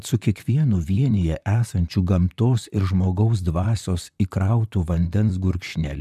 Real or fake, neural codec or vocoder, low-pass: fake; vocoder, 44.1 kHz, 128 mel bands, Pupu-Vocoder; 14.4 kHz